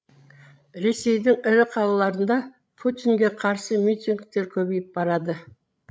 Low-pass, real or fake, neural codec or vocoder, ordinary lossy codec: none; fake; codec, 16 kHz, 16 kbps, FreqCodec, larger model; none